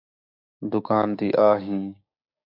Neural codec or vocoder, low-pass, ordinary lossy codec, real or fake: codec, 16 kHz, 6 kbps, DAC; 5.4 kHz; MP3, 48 kbps; fake